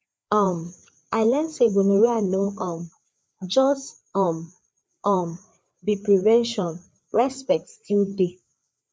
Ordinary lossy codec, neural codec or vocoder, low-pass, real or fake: none; codec, 16 kHz, 4 kbps, FreqCodec, larger model; none; fake